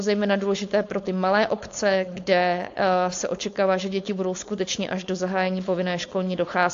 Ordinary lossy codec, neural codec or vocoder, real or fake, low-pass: AAC, 48 kbps; codec, 16 kHz, 4.8 kbps, FACodec; fake; 7.2 kHz